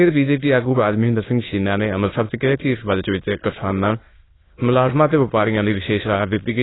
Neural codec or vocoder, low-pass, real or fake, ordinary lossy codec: autoencoder, 22.05 kHz, a latent of 192 numbers a frame, VITS, trained on many speakers; 7.2 kHz; fake; AAC, 16 kbps